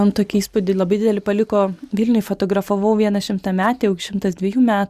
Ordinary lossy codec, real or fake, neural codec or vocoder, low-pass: Opus, 64 kbps; real; none; 14.4 kHz